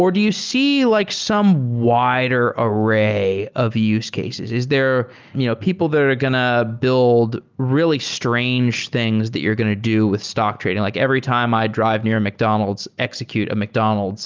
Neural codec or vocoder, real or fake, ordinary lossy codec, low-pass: none; real; Opus, 24 kbps; 7.2 kHz